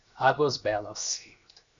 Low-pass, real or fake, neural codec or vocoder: 7.2 kHz; fake; codec, 16 kHz, 0.7 kbps, FocalCodec